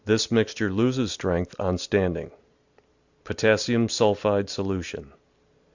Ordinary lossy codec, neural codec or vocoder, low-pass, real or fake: Opus, 64 kbps; none; 7.2 kHz; real